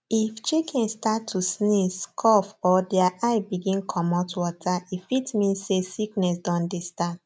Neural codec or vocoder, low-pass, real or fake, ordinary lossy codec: none; none; real; none